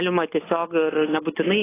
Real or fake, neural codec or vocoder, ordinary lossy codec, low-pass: real; none; AAC, 16 kbps; 3.6 kHz